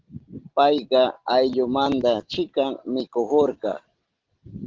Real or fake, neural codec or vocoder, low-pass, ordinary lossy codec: real; none; 7.2 kHz; Opus, 16 kbps